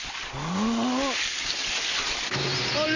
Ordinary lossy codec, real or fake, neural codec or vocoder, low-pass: none; real; none; 7.2 kHz